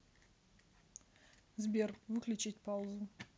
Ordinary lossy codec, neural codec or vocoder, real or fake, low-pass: none; none; real; none